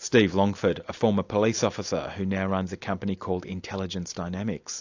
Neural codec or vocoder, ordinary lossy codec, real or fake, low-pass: none; AAC, 48 kbps; real; 7.2 kHz